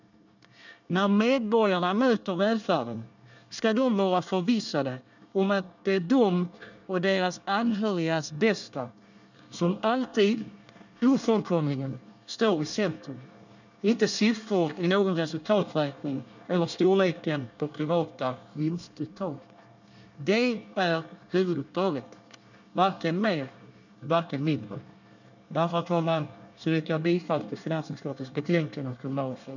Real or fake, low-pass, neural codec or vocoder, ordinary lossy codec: fake; 7.2 kHz; codec, 24 kHz, 1 kbps, SNAC; none